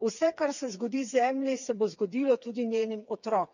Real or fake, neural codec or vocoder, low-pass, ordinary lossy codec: fake; codec, 16 kHz, 4 kbps, FreqCodec, smaller model; 7.2 kHz; none